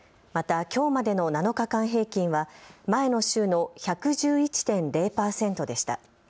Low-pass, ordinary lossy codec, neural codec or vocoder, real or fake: none; none; none; real